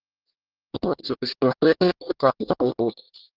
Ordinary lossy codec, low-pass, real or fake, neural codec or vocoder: Opus, 16 kbps; 5.4 kHz; fake; codec, 24 kHz, 1 kbps, SNAC